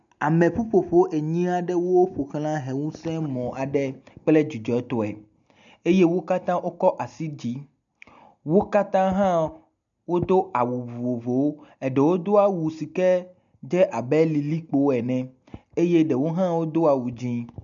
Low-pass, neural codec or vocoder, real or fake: 7.2 kHz; none; real